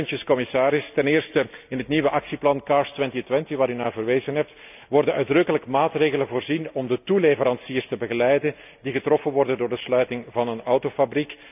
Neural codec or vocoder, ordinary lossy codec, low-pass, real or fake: none; none; 3.6 kHz; real